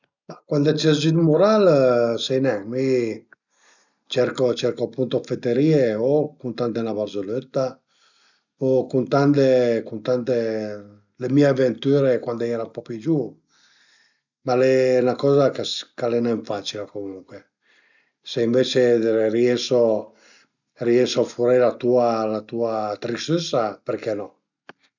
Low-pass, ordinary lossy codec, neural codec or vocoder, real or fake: 7.2 kHz; none; none; real